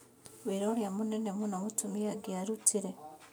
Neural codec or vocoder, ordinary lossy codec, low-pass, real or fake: none; none; none; real